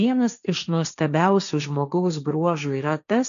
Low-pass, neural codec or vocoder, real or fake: 7.2 kHz; codec, 16 kHz, 1.1 kbps, Voila-Tokenizer; fake